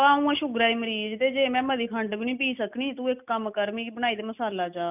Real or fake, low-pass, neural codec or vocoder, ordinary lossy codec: real; 3.6 kHz; none; none